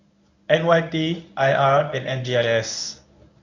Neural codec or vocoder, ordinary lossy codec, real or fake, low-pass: codec, 24 kHz, 0.9 kbps, WavTokenizer, medium speech release version 1; none; fake; 7.2 kHz